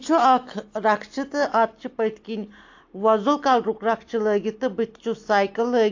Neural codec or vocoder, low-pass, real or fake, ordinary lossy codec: none; 7.2 kHz; real; AAC, 48 kbps